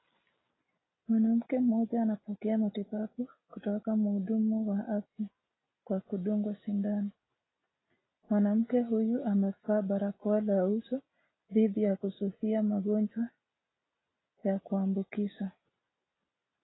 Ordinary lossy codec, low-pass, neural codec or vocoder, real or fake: AAC, 16 kbps; 7.2 kHz; none; real